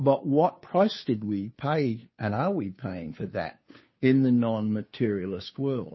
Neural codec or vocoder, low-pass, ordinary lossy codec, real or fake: codec, 16 kHz, 2 kbps, FunCodec, trained on Chinese and English, 25 frames a second; 7.2 kHz; MP3, 24 kbps; fake